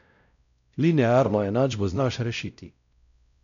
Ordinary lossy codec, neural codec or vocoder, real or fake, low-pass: none; codec, 16 kHz, 0.5 kbps, X-Codec, WavLM features, trained on Multilingual LibriSpeech; fake; 7.2 kHz